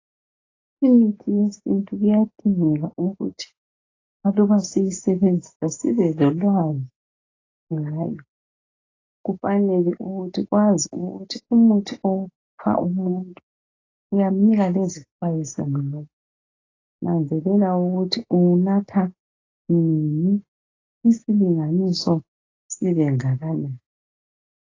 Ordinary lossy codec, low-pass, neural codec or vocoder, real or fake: AAC, 32 kbps; 7.2 kHz; none; real